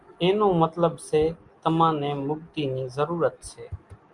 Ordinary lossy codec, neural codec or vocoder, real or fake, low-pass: Opus, 32 kbps; none; real; 10.8 kHz